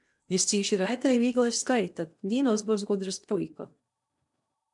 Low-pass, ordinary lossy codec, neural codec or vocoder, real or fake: 10.8 kHz; MP3, 96 kbps; codec, 16 kHz in and 24 kHz out, 0.8 kbps, FocalCodec, streaming, 65536 codes; fake